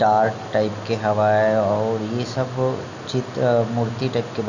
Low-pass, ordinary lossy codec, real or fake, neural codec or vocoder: 7.2 kHz; none; real; none